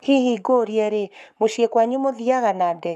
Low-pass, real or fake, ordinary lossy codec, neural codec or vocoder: 14.4 kHz; fake; none; codec, 44.1 kHz, 7.8 kbps, Pupu-Codec